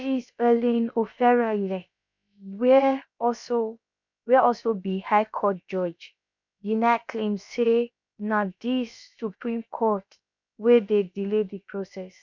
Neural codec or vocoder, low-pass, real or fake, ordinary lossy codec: codec, 16 kHz, about 1 kbps, DyCAST, with the encoder's durations; 7.2 kHz; fake; none